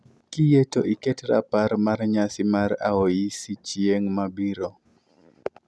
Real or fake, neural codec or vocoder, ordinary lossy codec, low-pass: real; none; none; none